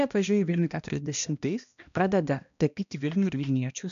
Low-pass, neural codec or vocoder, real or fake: 7.2 kHz; codec, 16 kHz, 1 kbps, X-Codec, HuBERT features, trained on balanced general audio; fake